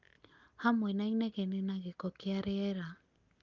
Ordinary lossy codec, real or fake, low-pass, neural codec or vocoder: Opus, 32 kbps; real; 7.2 kHz; none